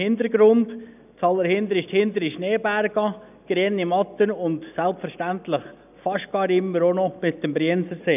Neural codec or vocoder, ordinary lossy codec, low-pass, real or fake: none; none; 3.6 kHz; real